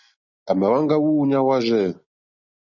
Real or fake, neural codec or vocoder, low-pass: real; none; 7.2 kHz